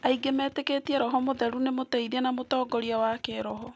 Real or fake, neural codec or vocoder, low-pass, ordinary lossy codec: real; none; none; none